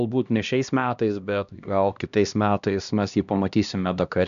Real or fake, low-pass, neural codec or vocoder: fake; 7.2 kHz; codec, 16 kHz, 1 kbps, X-Codec, HuBERT features, trained on LibriSpeech